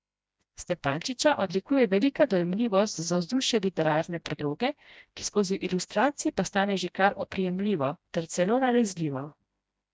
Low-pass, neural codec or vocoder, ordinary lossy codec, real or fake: none; codec, 16 kHz, 1 kbps, FreqCodec, smaller model; none; fake